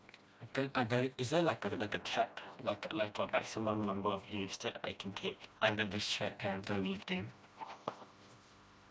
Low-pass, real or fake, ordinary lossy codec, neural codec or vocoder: none; fake; none; codec, 16 kHz, 1 kbps, FreqCodec, smaller model